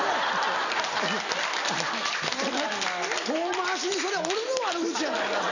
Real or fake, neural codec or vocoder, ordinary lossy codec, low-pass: real; none; none; 7.2 kHz